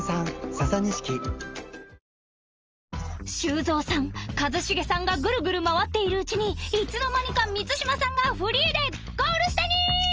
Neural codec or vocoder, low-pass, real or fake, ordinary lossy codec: none; 7.2 kHz; real; Opus, 24 kbps